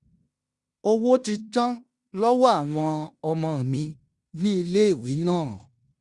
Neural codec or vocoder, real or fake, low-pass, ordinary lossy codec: codec, 16 kHz in and 24 kHz out, 0.9 kbps, LongCat-Audio-Codec, fine tuned four codebook decoder; fake; 10.8 kHz; Opus, 64 kbps